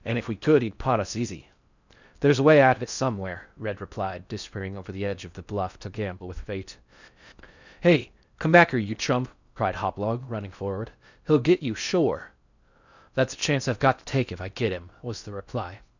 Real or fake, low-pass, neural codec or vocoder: fake; 7.2 kHz; codec, 16 kHz in and 24 kHz out, 0.6 kbps, FocalCodec, streaming, 4096 codes